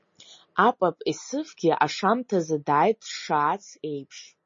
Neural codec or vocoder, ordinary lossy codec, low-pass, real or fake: none; MP3, 32 kbps; 7.2 kHz; real